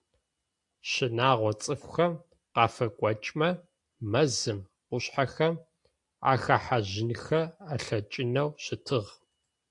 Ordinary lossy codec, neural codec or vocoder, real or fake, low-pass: AAC, 64 kbps; none; real; 10.8 kHz